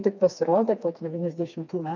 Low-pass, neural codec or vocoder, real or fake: 7.2 kHz; codec, 16 kHz, 2 kbps, FreqCodec, smaller model; fake